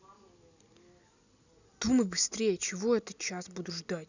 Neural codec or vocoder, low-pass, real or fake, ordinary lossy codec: none; 7.2 kHz; real; none